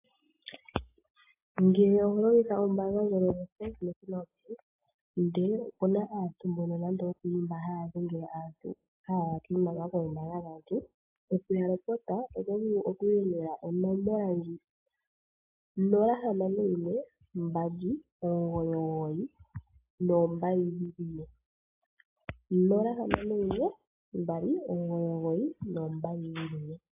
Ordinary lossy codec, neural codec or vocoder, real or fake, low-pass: AAC, 32 kbps; none; real; 3.6 kHz